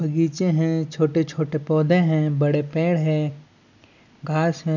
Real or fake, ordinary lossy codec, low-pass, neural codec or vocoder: real; none; 7.2 kHz; none